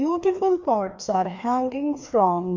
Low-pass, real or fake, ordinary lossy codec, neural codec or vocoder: 7.2 kHz; fake; MP3, 64 kbps; codec, 16 kHz, 2 kbps, FreqCodec, larger model